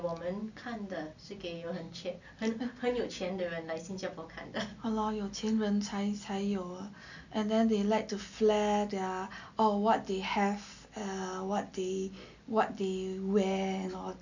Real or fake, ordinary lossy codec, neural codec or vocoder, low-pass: real; none; none; 7.2 kHz